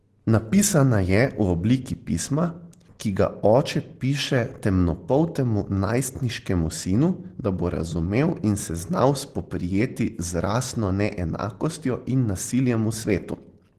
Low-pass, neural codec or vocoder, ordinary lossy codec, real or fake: 14.4 kHz; none; Opus, 16 kbps; real